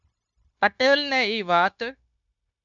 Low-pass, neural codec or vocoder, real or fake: 7.2 kHz; codec, 16 kHz, 0.9 kbps, LongCat-Audio-Codec; fake